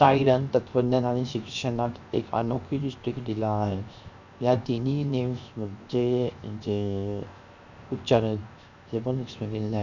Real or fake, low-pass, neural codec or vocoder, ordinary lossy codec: fake; 7.2 kHz; codec, 16 kHz, 0.7 kbps, FocalCodec; none